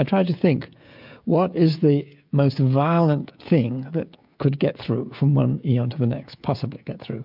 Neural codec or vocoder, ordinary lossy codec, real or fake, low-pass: codec, 16 kHz, 16 kbps, FreqCodec, smaller model; MP3, 48 kbps; fake; 5.4 kHz